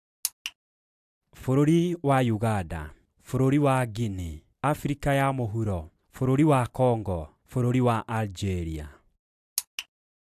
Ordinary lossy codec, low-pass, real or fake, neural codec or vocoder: AAC, 96 kbps; 14.4 kHz; real; none